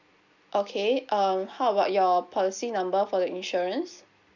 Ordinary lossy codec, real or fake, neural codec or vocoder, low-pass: none; real; none; 7.2 kHz